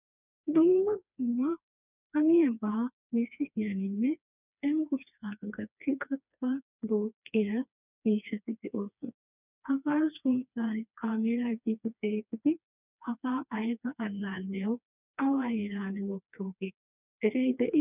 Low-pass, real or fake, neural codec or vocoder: 3.6 kHz; fake; codec, 16 kHz, 2 kbps, FreqCodec, smaller model